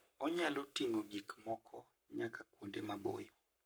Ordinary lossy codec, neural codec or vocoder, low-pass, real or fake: none; codec, 44.1 kHz, 7.8 kbps, Pupu-Codec; none; fake